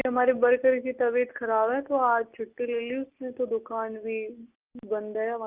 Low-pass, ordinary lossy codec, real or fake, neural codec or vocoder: 3.6 kHz; Opus, 32 kbps; real; none